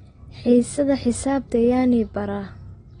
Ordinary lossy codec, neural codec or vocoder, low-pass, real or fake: AAC, 32 kbps; none; 9.9 kHz; real